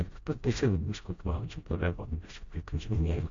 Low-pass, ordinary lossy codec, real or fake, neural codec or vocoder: 7.2 kHz; MP3, 48 kbps; fake; codec, 16 kHz, 0.5 kbps, FreqCodec, smaller model